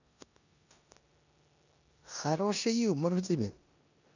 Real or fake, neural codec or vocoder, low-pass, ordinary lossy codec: fake; codec, 16 kHz in and 24 kHz out, 0.9 kbps, LongCat-Audio-Codec, four codebook decoder; 7.2 kHz; none